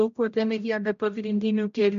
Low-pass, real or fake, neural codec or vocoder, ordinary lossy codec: 7.2 kHz; fake; codec, 16 kHz, 0.5 kbps, X-Codec, HuBERT features, trained on general audio; MP3, 48 kbps